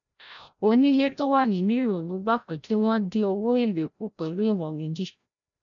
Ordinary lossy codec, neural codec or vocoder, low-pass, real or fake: MP3, 96 kbps; codec, 16 kHz, 0.5 kbps, FreqCodec, larger model; 7.2 kHz; fake